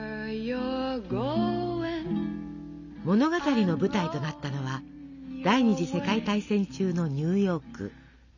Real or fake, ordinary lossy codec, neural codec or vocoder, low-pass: real; none; none; 7.2 kHz